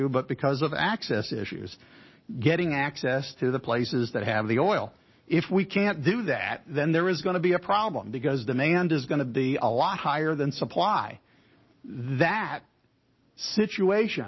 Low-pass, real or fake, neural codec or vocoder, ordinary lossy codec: 7.2 kHz; real; none; MP3, 24 kbps